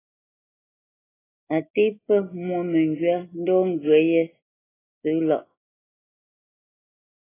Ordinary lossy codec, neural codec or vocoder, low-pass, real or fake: AAC, 16 kbps; none; 3.6 kHz; real